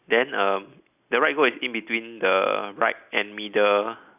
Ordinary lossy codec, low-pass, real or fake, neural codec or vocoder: none; 3.6 kHz; real; none